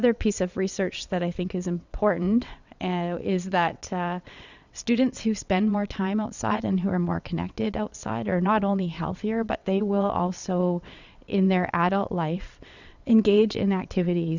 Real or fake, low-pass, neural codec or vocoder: fake; 7.2 kHz; vocoder, 22.05 kHz, 80 mel bands, WaveNeXt